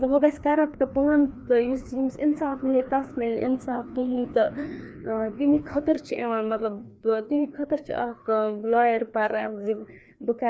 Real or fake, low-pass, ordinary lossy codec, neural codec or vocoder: fake; none; none; codec, 16 kHz, 2 kbps, FreqCodec, larger model